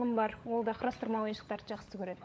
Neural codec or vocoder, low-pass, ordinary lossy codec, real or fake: codec, 16 kHz, 16 kbps, FunCodec, trained on LibriTTS, 50 frames a second; none; none; fake